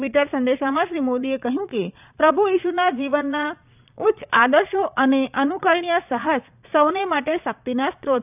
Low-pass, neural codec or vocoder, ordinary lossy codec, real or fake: 3.6 kHz; vocoder, 22.05 kHz, 80 mel bands, Vocos; none; fake